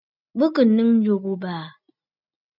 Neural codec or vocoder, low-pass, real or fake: none; 5.4 kHz; real